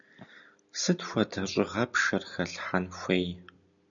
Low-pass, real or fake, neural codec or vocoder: 7.2 kHz; real; none